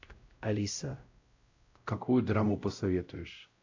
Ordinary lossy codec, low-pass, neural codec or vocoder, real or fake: AAC, 48 kbps; 7.2 kHz; codec, 16 kHz, 0.5 kbps, X-Codec, WavLM features, trained on Multilingual LibriSpeech; fake